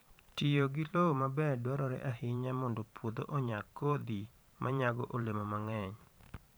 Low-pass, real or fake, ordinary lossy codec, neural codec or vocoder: none; fake; none; vocoder, 44.1 kHz, 128 mel bands every 512 samples, BigVGAN v2